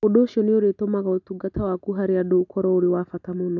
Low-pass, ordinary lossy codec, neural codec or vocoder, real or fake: 7.2 kHz; none; none; real